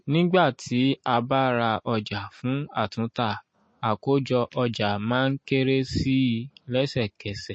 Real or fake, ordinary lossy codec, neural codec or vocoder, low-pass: real; MP3, 32 kbps; none; 9.9 kHz